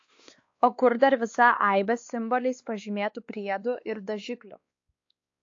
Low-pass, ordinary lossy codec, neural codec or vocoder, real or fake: 7.2 kHz; AAC, 48 kbps; codec, 16 kHz, 2 kbps, X-Codec, WavLM features, trained on Multilingual LibriSpeech; fake